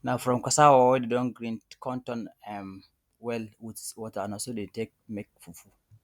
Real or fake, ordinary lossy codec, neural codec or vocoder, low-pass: real; none; none; 19.8 kHz